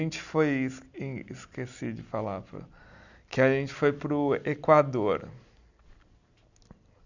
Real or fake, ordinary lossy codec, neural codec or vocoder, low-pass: real; none; none; 7.2 kHz